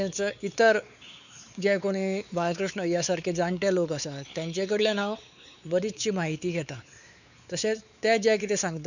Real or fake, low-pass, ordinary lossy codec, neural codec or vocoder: fake; 7.2 kHz; none; codec, 16 kHz, 4 kbps, X-Codec, WavLM features, trained on Multilingual LibriSpeech